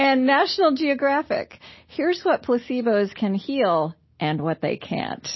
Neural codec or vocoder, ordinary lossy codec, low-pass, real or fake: none; MP3, 24 kbps; 7.2 kHz; real